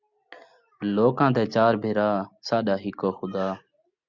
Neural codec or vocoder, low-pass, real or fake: none; 7.2 kHz; real